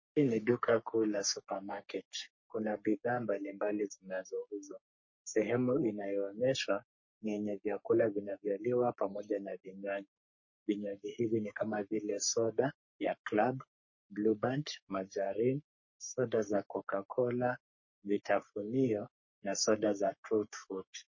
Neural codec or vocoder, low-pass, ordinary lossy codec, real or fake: codec, 44.1 kHz, 3.4 kbps, Pupu-Codec; 7.2 kHz; MP3, 32 kbps; fake